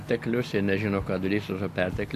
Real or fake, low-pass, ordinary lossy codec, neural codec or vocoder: real; 14.4 kHz; AAC, 64 kbps; none